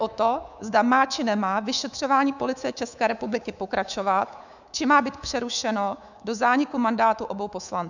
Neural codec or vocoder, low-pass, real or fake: codec, 24 kHz, 3.1 kbps, DualCodec; 7.2 kHz; fake